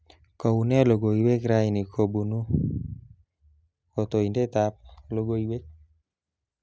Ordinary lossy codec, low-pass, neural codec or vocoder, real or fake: none; none; none; real